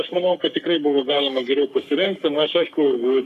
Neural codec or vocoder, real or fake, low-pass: codec, 44.1 kHz, 3.4 kbps, Pupu-Codec; fake; 14.4 kHz